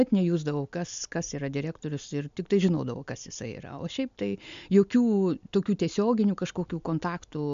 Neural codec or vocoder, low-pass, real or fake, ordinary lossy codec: none; 7.2 kHz; real; MP3, 64 kbps